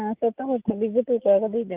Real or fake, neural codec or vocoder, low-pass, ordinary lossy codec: real; none; 3.6 kHz; Opus, 32 kbps